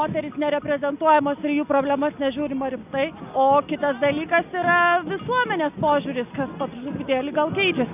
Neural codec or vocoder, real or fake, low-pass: none; real; 3.6 kHz